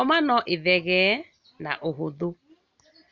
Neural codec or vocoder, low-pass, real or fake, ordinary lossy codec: none; 7.2 kHz; real; Opus, 64 kbps